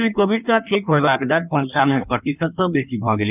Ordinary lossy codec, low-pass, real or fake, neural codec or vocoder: none; 3.6 kHz; fake; codec, 16 kHz in and 24 kHz out, 1.1 kbps, FireRedTTS-2 codec